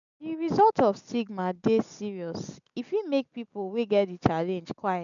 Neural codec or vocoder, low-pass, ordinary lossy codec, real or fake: none; 7.2 kHz; none; real